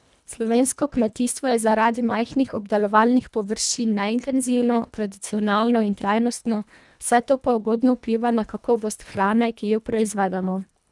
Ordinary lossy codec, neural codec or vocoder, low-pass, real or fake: none; codec, 24 kHz, 1.5 kbps, HILCodec; none; fake